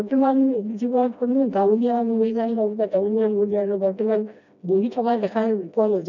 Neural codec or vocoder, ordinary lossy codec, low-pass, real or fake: codec, 16 kHz, 1 kbps, FreqCodec, smaller model; none; 7.2 kHz; fake